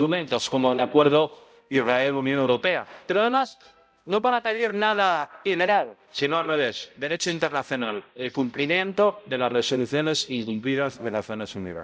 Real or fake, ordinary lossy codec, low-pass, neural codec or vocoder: fake; none; none; codec, 16 kHz, 0.5 kbps, X-Codec, HuBERT features, trained on balanced general audio